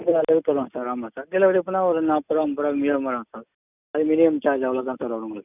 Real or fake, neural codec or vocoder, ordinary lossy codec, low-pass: real; none; none; 3.6 kHz